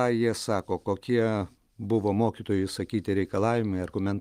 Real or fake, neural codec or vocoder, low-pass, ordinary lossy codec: real; none; 14.4 kHz; Opus, 32 kbps